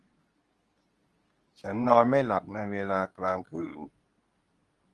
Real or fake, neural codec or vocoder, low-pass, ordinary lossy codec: fake; codec, 24 kHz, 0.9 kbps, WavTokenizer, medium speech release version 2; 10.8 kHz; Opus, 24 kbps